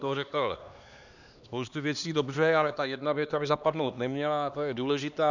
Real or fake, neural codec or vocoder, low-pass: fake; codec, 16 kHz, 2 kbps, X-Codec, HuBERT features, trained on LibriSpeech; 7.2 kHz